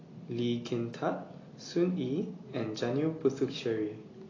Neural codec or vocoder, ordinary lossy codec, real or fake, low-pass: none; AAC, 32 kbps; real; 7.2 kHz